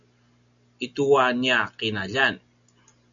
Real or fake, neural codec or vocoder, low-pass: real; none; 7.2 kHz